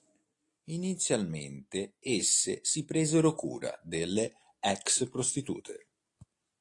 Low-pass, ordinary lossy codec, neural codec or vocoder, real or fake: 10.8 kHz; AAC, 48 kbps; none; real